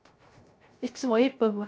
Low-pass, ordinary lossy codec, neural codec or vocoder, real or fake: none; none; codec, 16 kHz, 0.3 kbps, FocalCodec; fake